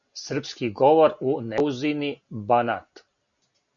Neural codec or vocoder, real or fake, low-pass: none; real; 7.2 kHz